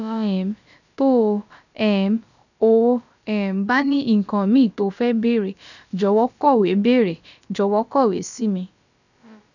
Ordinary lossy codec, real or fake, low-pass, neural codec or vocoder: none; fake; 7.2 kHz; codec, 16 kHz, about 1 kbps, DyCAST, with the encoder's durations